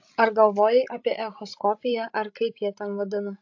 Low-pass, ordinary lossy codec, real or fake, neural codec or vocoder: 7.2 kHz; AAC, 48 kbps; fake; codec, 16 kHz, 16 kbps, FreqCodec, larger model